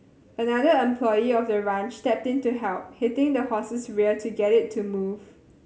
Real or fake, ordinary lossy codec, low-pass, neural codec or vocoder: real; none; none; none